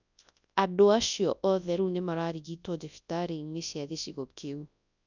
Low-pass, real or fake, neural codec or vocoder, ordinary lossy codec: 7.2 kHz; fake; codec, 24 kHz, 0.9 kbps, WavTokenizer, large speech release; none